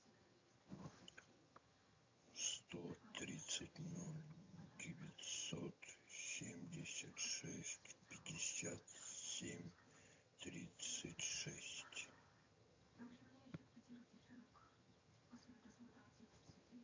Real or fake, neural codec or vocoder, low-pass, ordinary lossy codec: fake; vocoder, 22.05 kHz, 80 mel bands, HiFi-GAN; 7.2 kHz; MP3, 48 kbps